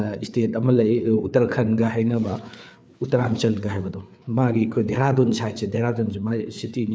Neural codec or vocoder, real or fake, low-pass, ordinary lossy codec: codec, 16 kHz, 4 kbps, FreqCodec, larger model; fake; none; none